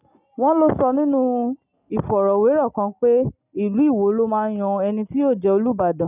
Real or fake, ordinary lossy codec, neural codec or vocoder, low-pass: real; none; none; 3.6 kHz